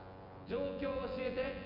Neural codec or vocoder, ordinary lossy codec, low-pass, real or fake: vocoder, 24 kHz, 100 mel bands, Vocos; none; 5.4 kHz; fake